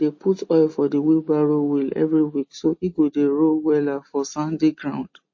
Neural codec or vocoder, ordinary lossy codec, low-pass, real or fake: none; MP3, 32 kbps; 7.2 kHz; real